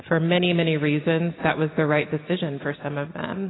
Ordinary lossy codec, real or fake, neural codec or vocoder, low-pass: AAC, 16 kbps; real; none; 7.2 kHz